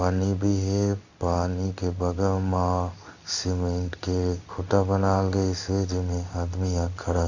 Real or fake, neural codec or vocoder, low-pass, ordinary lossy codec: fake; codec, 16 kHz in and 24 kHz out, 1 kbps, XY-Tokenizer; 7.2 kHz; none